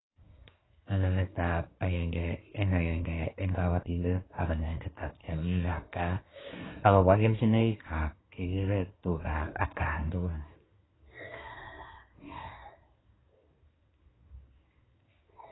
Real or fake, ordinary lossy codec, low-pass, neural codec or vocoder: fake; AAC, 16 kbps; 7.2 kHz; codec, 24 kHz, 1 kbps, SNAC